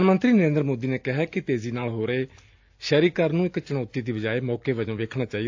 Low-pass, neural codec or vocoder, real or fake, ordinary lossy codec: 7.2 kHz; none; real; AAC, 48 kbps